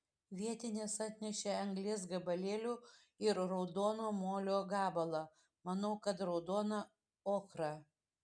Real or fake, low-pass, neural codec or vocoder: real; 9.9 kHz; none